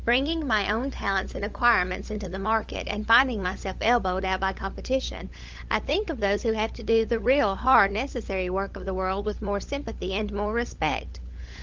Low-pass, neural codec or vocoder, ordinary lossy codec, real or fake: 7.2 kHz; codec, 16 kHz, 16 kbps, FunCodec, trained on LibriTTS, 50 frames a second; Opus, 32 kbps; fake